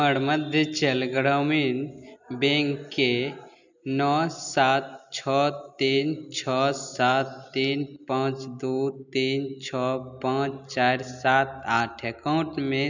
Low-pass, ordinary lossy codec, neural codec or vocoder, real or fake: 7.2 kHz; none; none; real